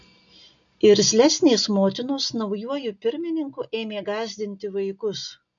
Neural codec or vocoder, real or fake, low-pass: none; real; 7.2 kHz